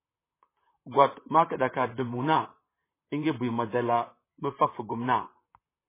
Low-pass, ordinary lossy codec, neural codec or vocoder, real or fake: 3.6 kHz; MP3, 16 kbps; vocoder, 44.1 kHz, 128 mel bands, Pupu-Vocoder; fake